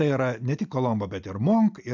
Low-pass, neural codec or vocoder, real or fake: 7.2 kHz; none; real